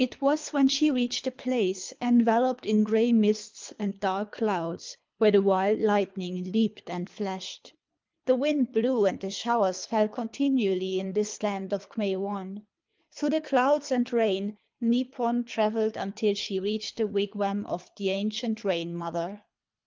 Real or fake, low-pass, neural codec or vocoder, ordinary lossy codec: fake; 7.2 kHz; codec, 24 kHz, 3 kbps, HILCodec; Opus, 32 kbps